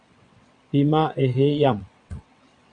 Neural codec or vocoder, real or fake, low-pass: vocoder, 22.05 kHz, 80 mel bands, WaveNeXt; fake; 9.9 kHz